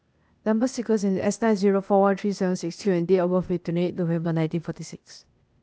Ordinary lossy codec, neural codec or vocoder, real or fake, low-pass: none; codec, 16 kHz, 0.8 kbps, ZipCodec; fake; none